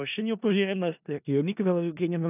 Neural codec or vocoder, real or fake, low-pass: codec, 16 kHz in and 24 kHz out, 0.4 kbps, LongCat-Audio-Codec, four codebook decoder; fake; 3.6 kHz